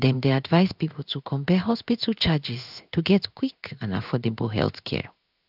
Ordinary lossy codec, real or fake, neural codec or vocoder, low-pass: none; fake; codec, 16 kHz in and 24 kHz out, 1 kbps, XY-Tokenizer; 5.4 kHz